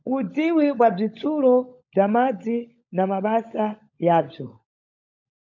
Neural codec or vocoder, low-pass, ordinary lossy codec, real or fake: codec, 16 kHz, 16 kbps, FunCodec, trained on LibriTTS, 50 frames a second; 7.2 kHz; MP3, 48 kbps; fake